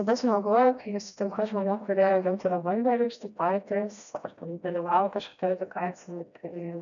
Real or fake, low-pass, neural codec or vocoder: fake; 7.2 kHz; codec, 16 kHz, 1 kbps, FreqCodec, smaller model